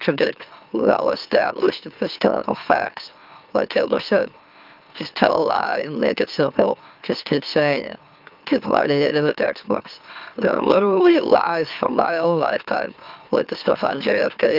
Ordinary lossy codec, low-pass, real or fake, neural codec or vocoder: Opus, 24 kbps; 5.4 kHz; fake; autoencoder, 44.1 kHz, a latent of 192 numbers a frame, MeloTTS